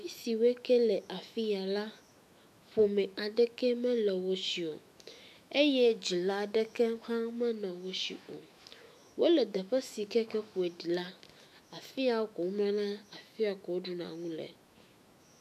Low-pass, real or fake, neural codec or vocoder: 14.4 kHz; fake; autoencoder, 48 kHz, 128 numbers a frame, DAC-VAE, trained on Japanese speech